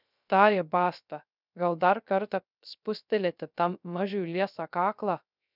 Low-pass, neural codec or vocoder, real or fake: 5.4 kHz; codec, 16 kHz, 0.3 kbps, FocalCodec; fake